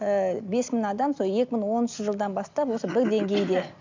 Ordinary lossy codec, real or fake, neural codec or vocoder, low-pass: MP3, 64 kbps; real; none; 7.2 kHz